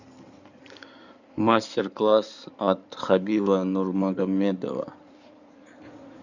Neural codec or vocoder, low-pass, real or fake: codec, 16 kHz in and 24 kHz out, 2.2 kbps, FireRedTTS-2 codec; 7.2 kHz; fake